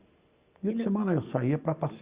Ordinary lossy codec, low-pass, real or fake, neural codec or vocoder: Opus, 64 kbps; 3.6 kHz; real; none